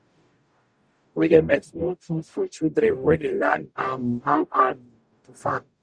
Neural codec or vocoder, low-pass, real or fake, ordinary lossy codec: codec, 44.1 kHz, 0.9 kbps, DAC; 9.9 kHz; fake; Opus, 64 kbps